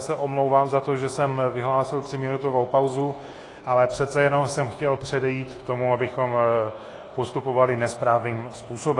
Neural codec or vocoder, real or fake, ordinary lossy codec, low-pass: codec, 24 kHz, 1.2 kbps, DualCodec; fake; AAC, 32 kbps; 10.8 kHz